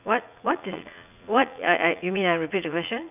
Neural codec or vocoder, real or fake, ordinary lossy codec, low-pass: vocoder, 44.1 kHz, 80 mel bands, Vocos; fake; none; 3.6 kHz